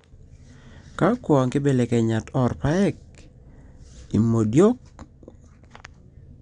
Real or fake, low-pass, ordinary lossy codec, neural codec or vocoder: real; 9.9 kHz; none; none